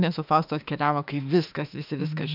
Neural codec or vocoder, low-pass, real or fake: autoencoder, 48 kHz, 32 numbers a frame, DAC-VAE, trained on Japanese speech; 5.4 kHz; fake